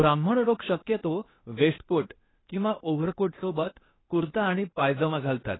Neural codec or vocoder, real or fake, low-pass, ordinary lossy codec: codec, 16 kHz, 0.8 kbps, ZipCodec; fake; 7.2 kHz; AAC, 16 kbps